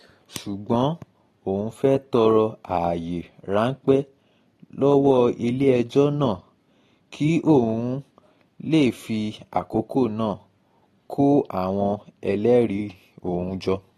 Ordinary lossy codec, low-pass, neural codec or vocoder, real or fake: AAC, 32 kbps; 19.8 kHz; none; real